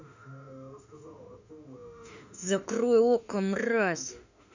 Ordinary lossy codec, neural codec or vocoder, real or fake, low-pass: none; autoencoder, 48 kHz, 32 numbers a frame, DAC-VAE, trained on Japanese speech; fake; 7.2 kHz